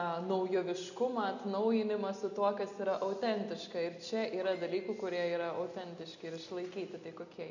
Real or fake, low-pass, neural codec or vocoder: real; 7.2 kHz; none